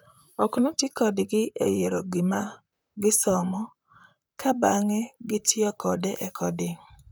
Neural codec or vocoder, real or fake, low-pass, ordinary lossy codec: vocoder, 44.1 kHz, 128 mel bands, Pupu-Vocoder; fake; none; none